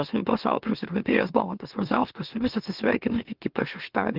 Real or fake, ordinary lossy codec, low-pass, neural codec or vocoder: fake; Opus, 24 kbps; 5.4 kHz; autoencoder, 44.1 kHz, a latent of 192 numbers a frame, MeloTTS